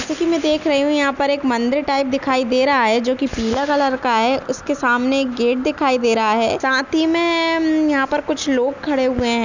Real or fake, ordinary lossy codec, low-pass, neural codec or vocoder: real; none; 7.2 kHz; none